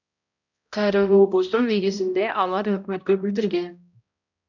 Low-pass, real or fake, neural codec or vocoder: 7.2 kHz; fake; codec, 16 kHz, 0.5 kbps, X-Codec, HuBERT features, trained on balanced general audio